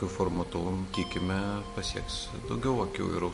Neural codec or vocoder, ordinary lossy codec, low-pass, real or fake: none; MP3, 48 kbps; 14.4 kHz; real